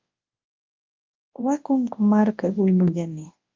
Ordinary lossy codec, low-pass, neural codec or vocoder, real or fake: Opus, 32 kbps; 7.2 kHz; codec, 24 kHz, 0.9 kbps, WavTokenizer, large speech release; fake